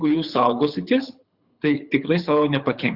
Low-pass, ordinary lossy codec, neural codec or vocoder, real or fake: 5.4 kHz; Opus, 64 kbps; codec, 24 kHz, 6 kbps, HILCodec; fake